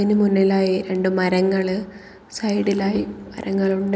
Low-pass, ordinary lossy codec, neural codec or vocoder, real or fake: none; none; none; real